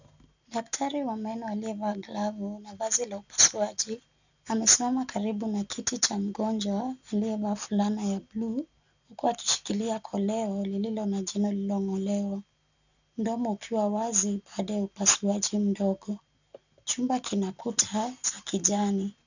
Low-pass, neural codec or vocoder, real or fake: 7.2 kHz; none; real